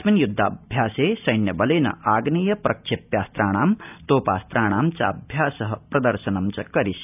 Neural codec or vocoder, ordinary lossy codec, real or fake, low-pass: none; none; real; 3.6 kHz